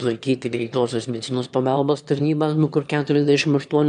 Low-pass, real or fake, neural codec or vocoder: 9.9 kHz; fake; autoencoder, 22.05 kHz, a latent of 192 numbers a frame, VITS, trained on one speaker